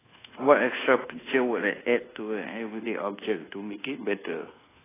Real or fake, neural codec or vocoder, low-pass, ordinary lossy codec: fake; codec, 24 kHz, 1.2 kbps, DualCodec; 3.6 kHz; AAC, 16 kbps